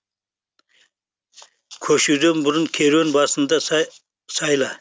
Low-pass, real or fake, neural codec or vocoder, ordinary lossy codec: none; real; none; none